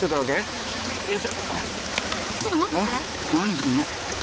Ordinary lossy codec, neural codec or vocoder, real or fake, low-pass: none; codec, 16 kHz, 4 kbps, X-Codec, HuBERT features, trained on balanced general audio; fake; none